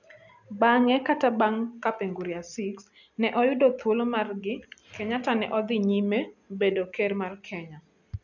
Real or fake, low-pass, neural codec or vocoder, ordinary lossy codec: real; 7.2 kHz; none; none